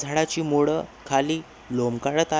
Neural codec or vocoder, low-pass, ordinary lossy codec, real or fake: none; none; none; real